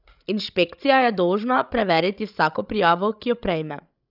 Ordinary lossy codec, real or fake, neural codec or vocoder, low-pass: none; fake; codec, 16 kHz, 16 kbps, FreqCodec, larger model; 5.4 kHz